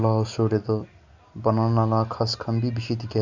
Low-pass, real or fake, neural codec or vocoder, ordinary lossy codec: 7.2 kHz; real; none; Opus, 64 kbps